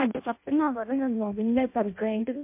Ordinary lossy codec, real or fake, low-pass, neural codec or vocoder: MP3, 24 kbps; fake; 3.6 kHz; codec, 16 kHz in and 24 kHz out, 0.6 kbps, FireRedTTS-2 codec